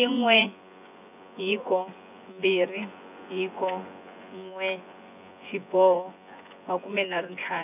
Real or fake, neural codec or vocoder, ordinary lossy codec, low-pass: fake; vocoder, 24 kHz, 100 mel bands, Vocos; none; 3.6 kHz